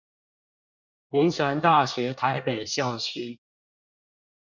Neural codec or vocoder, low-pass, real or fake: codec, 24 kHz, 1 kbps, SNAC; 7.2 kHz; fake